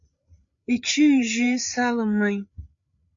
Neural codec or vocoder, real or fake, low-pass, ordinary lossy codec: codec, 16 kHz, 8 kbps, FreqCodec, larger model; fake; 7.2 kHz; MP3, 64 kbps